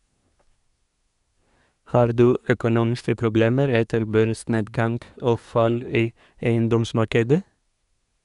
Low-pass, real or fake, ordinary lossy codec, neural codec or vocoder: 10.8 kHz; fake; none; codec, 24 kHz, 1 kbps, SNAC